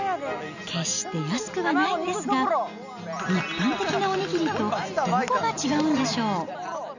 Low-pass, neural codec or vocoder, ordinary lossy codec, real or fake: 7.2 kHz; none; none; real